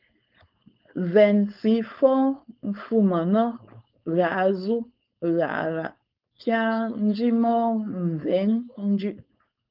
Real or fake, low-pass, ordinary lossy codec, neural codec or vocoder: fake; 5.4 kHz; Opus, 24 kbps; codec, 16 kHz, 4.8 kbps, FACodec